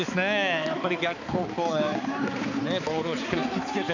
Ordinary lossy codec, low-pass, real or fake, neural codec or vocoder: none; 7.2 kHz; fake; codec, 16 kHz, 4 kbps, X-Codec, HuBERT features, trained on balanced general audio